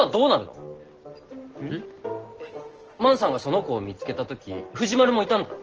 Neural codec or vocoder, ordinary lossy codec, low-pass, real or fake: none; Opus, 16 kbps; 7.2 kHz; real